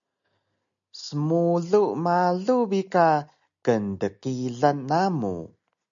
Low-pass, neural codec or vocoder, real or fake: 7.2 kHz; none; real